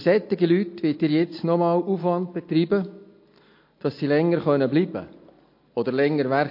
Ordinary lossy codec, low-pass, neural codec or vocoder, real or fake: MP3, 32 kbps; 5.4 kHz; none; real